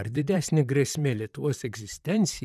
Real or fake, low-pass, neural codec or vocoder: fake; 14.4 kHz; vocoder, 44.1 kHz, 128 mel bands, Pupu-Vocoder